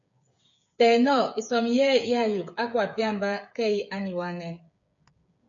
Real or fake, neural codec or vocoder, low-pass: fake; codec, 16 kHz, 8 kbps, FreqCodec, smaller model; 7.2 kHz